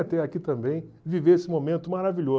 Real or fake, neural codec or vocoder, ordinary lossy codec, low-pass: real; none; none; none